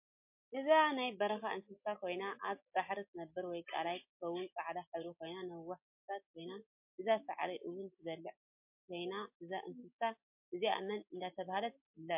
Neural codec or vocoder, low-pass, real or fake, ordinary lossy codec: none; 3.6 kHz; real; MP3, 32 kbps